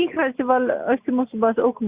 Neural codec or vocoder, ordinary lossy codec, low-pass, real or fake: none; Opus, 64 kbps; 3.6 kHz; real